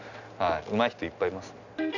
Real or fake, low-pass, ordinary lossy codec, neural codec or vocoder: real; 7.2 kHz; none; none